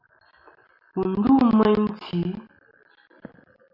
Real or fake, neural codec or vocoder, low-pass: real; none; 5.4 kHz